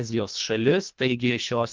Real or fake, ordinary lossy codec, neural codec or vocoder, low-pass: fake; Opus, 32 kbps; codec, 24 kHz, 1.5 kbps, HILCodec; 7.2 kHz